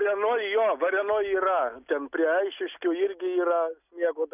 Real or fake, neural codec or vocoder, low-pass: real; none; 3.6 kHz